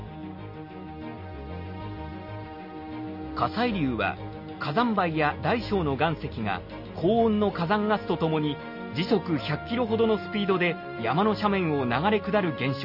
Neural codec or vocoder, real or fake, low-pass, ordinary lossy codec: none; real; 5.4 kHz; none